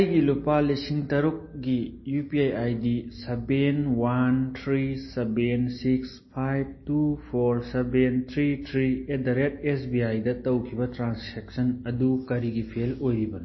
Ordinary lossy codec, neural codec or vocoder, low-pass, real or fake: MP3, 24 kbps; none; 7.2 kHz; real